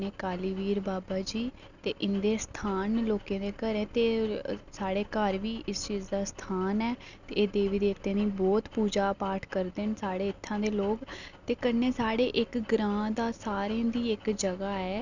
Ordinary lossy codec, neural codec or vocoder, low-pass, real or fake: none; none; 7.2 kHz; real